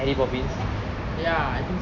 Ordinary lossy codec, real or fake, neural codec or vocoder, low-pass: none; real; none; 7.2 kHz